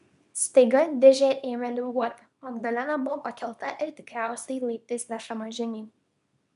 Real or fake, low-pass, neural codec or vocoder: fake; 10.8 kHz; codec, 24 kHz, 0.9 kbps, WavTokenizer, small release